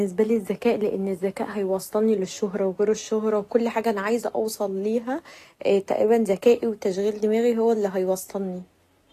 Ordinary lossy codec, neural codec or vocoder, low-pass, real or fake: AAC, 48 kbps; none; 14.4 kHz; real